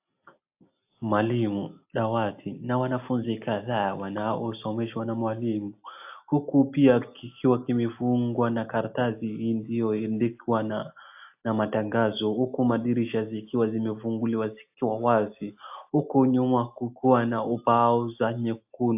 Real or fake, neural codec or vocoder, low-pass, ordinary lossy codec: real; none; 3.6 kHz; AAC, 32 kbps